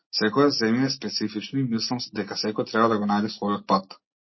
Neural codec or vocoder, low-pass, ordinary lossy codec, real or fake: none; 7.2 kHz; MP3, 24 kbps; real